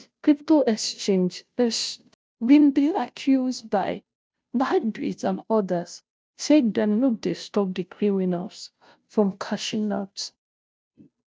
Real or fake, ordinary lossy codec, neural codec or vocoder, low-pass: fake; none; codec, 16 kHz, 0.5 kbps, FunCodec, trained on Chinese and English, 25 frames a second; none